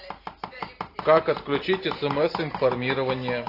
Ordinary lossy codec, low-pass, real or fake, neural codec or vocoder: AAC, 32 kbps; 5.4 kHz; real; none